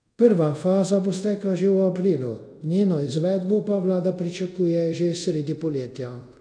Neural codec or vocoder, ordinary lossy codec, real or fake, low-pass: codec, 24 kHz, 0.5 kbps, DualCodec; none; fake; 9.9 kHz